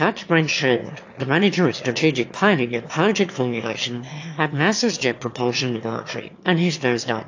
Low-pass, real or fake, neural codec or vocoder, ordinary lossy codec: 7.2 kHz; fake; autoencoder, 22.05 kHz, a latent of 192 numbers a frame, VITS, trained on one speaker; AAC, 48 kbps